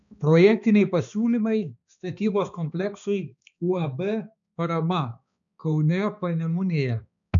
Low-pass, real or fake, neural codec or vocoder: 7.2 kHz; fake; codec, 16 kHz, 2 kbps, X-Codec, HuBERT features, trained on balanced general audio